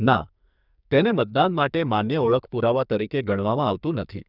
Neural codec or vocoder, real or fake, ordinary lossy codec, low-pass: codec, 44.1 kHz, 2.6 kbps, SNAC; fake; none; 5.4 kHz